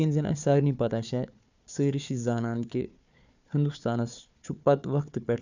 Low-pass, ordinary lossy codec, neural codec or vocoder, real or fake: 7.2 kHz; none; codec, 16 kHz, 4 kbps, FunCodec, trained on Chinese and English, 50 frames a second; fake